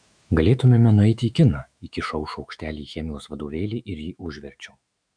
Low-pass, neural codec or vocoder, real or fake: 9.9 kHz; autoencoder, 48 kHz, 128 numbers a frame, DAC-VAE, trained on Japanese speech; fake